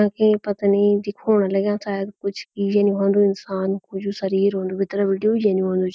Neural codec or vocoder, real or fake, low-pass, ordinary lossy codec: none; real; none; none